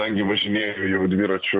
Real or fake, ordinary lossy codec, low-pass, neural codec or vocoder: fake; AAC, 64 kbps; 9.9 kHz; vocoder, 44.1 kHz, 128 mel bands every 512 samples, BigVGAN v2